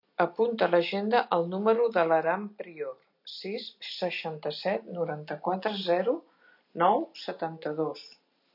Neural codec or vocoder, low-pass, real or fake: none; 5.4 kHz; real